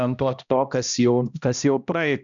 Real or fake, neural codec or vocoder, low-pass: fake; codec, 16 kHz, 1 kbps, X-Codec, HuBERT features, trained on balanced general audio; 7.2 kHz